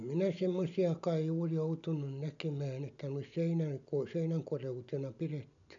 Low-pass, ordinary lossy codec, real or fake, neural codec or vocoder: 7.2 kHz; none; real; none